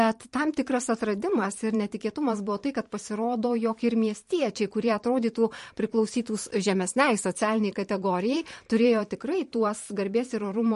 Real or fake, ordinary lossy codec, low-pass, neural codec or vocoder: fake; MP3, 48 kbps; 14.4 kHz; vocoder, 44.1 kHz, 128 mel bands every 512 samples, BigVGAN v2